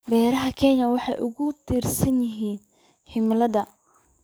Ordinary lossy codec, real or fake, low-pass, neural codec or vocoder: none; fake; none; codec, 44.1 kHz, 7.8 kbps, DAC